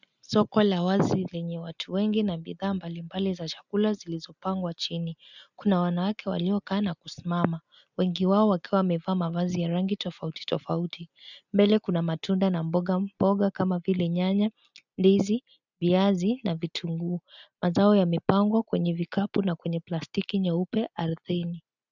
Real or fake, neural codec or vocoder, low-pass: real; none; 7.2 kHz